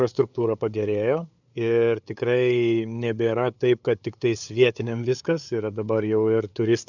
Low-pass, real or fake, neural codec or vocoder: 7.2 kHz; fake; codec, 16 kHz, 8 kbps, FunCodec, trained on LibriTTS, 25 frames a second